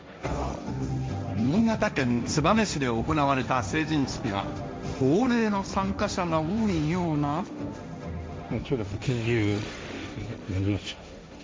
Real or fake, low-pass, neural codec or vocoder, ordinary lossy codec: fake; none; codec, 16 kHz, 1.1 kbps, Voila-Tokenizer; none